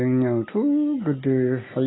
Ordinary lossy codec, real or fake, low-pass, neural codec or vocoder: AAC, 16 kbps; real; 7.2 kHz; none